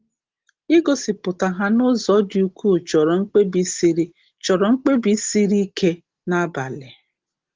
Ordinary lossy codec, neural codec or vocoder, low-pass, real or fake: Opus, 16 kbps; none; 7.2 kHz; real